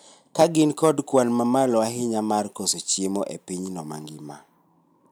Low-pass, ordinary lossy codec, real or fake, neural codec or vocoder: none; none; real; none